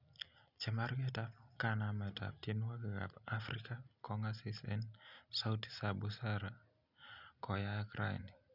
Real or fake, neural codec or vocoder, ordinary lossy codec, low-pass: real; none; none; 5.4 kHz